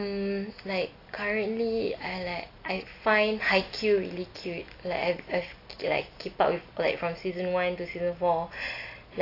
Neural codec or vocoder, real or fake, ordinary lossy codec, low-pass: none; real; AAC, 32 kbps; 5.4 kHz